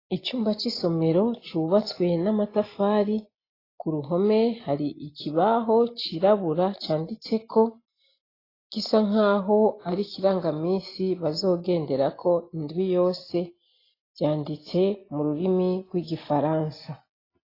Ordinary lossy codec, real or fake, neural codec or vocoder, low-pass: AAC, 24 kbps; real; none; 5.4 kHz